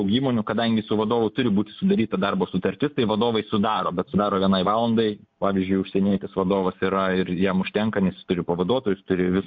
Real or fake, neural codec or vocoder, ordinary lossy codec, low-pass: real; none; MP3, 48 kbps; 7.2 kHz